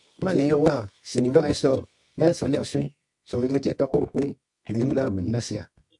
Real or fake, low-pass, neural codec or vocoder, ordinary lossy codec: fake; 10.8 kHz; codec, 24 kHz, 0.9 kbps, WavTokenizer, medium music audio release; MP3, 64 kbps